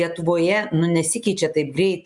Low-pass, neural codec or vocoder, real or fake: 10.8 kHz; none; real